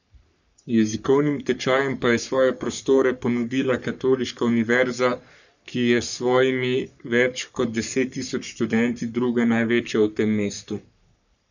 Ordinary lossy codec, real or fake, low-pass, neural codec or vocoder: none; fake; 7.2 kHz; codec, 44.1 kHz, 3.4 kbps, Pupu-Codec